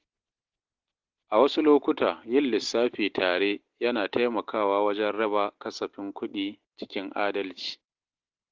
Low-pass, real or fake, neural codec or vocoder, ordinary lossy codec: 7.2 kHz; real; none; Opus, 16 kbps